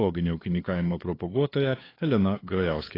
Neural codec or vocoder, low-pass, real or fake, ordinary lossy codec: codec, 16 kHz, 2 kbps, FunCodec, trained on LibriTTS, 25 frames a second; 5.4 kHz; fake; AAC, 24 kbps